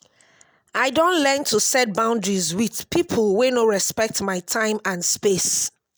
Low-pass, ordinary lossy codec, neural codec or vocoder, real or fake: none; none; none; real